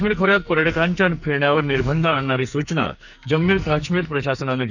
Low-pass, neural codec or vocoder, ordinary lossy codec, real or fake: 7.2 kHz; codec, 44.1 kHz, 2.6 kbps, SNAC; none; fake